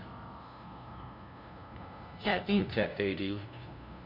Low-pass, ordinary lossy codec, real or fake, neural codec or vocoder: 5.4 kHz; none; fake; codec, 16 kHz, 0.5 kbps, FunCodec, trained on LibriTTS, 25 frames a second